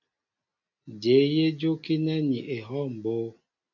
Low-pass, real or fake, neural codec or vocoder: 7.2 kHz; real; none